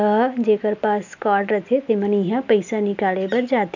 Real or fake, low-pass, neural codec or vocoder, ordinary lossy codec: real; 7.2 kHz; none; none